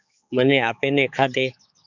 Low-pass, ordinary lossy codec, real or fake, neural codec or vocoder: 7.2 kHz; MP3, 64 kbps; fake; codec, 16 kHz, 4 kbps, X-Codec, HuBERT features, trained on balanced general audio